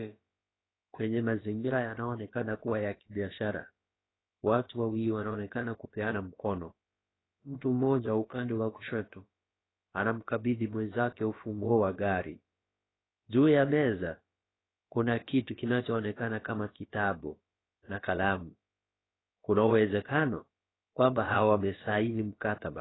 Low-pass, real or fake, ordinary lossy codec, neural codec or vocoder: 7.2 kHz; fake; AAC, 16 kbps; codec, 16 kHz, about 1 kbps, DyCAST, with the encoder's durations